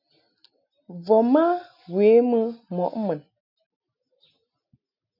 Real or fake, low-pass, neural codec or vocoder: real; 5.4 kHz; none